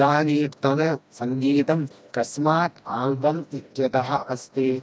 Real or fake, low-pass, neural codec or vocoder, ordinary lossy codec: fake; none; codec, 16 kHz, 1 kbps, FreqCodec, smaller model; none